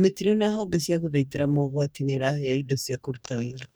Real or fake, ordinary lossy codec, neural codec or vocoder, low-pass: fake; none; codec, 44.1 kHz, 2.6 kbps, DAC; none